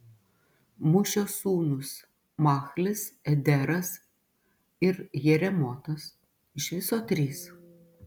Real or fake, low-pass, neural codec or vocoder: real; 19.8 kHz; none